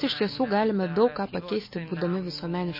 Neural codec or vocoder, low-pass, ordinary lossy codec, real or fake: autoencoder, 48 kHz, 128 numbers a frame, DAC-VAE, trained on Japanese speech; 5.4 kHz; MP3, 24 kbps; fake